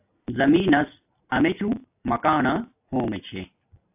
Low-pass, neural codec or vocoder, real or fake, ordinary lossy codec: 3.6 kHz; none; real; AAC, 32 kbps